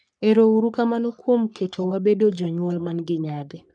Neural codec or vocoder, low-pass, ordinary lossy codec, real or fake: codec, 44.1 kHz, 3.4 kbps, Pupu-Codec; 9.9 kHz; none; fake